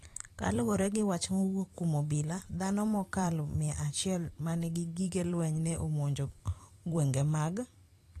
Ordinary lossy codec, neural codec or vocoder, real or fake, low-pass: AAC, 48 kbps; vocoder, 44.1 kHz, 128 mel bands every 256 samples, BigVGAN v2; fake; 14.4 kHz